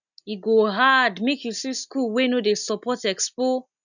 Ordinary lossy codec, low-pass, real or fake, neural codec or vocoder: none; 7.2 kHz; real; none